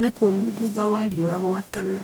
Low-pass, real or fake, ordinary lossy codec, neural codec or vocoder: none; fake; none; codec, 44.1 kHz, 0.9 kbps, DAC